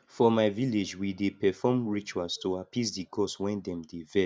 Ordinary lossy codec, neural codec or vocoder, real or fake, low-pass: none; none; real; none